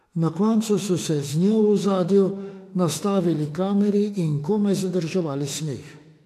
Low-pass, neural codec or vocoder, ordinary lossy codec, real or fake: 14.4 kHz; autoencoder, 48 kHz, 32 numbers a frame, DAC-VAE, trained on Japanese speech; AAC, 64 kbps; fake